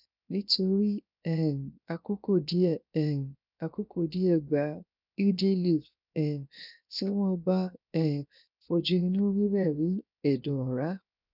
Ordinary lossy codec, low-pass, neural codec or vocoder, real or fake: none; 5.4 kHz; codec, 16 kHz, 0.7 kbps, FocalCodec; fake